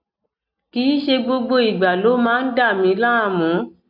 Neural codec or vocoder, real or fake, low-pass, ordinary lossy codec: none; real; 5.4 kHz; none